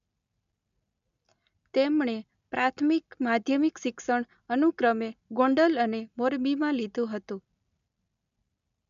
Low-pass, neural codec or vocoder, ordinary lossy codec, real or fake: 7.2 kHz; none; none; real